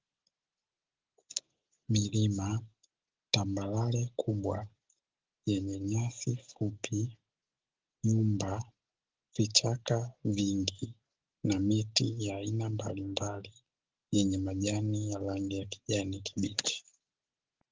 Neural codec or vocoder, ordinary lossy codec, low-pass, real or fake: none; Opus, 16 kbps; 7.2 kHz; real